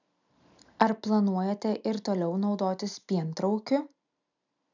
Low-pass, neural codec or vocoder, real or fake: 7.2 kHz; none; real